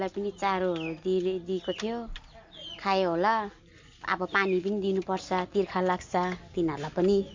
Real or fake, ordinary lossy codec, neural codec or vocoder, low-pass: real; MP3, 48 kbps; none; 7.2 kHz